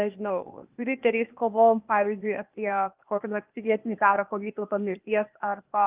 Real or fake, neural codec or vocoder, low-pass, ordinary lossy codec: fake; codec, 16 kHz, 0.8 kbps, ZipCodec; 3.6 kHz; Opus, 32 kbps